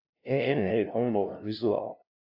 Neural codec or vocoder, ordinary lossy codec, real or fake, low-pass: codec, 16 kHz, 0.5 kbps, FunCodec, trained on LibriTTS, 25 frames a second; AAC, 48 kbps; fake; 5.4 kHz